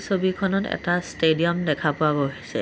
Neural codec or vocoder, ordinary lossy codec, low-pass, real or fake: none; none; none; real